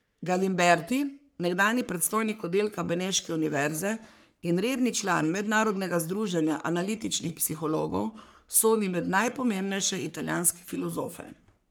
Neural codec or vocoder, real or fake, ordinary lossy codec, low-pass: codec, 44.1 kHz, 3.4 kbps, Pupu-Codec; fake; none; none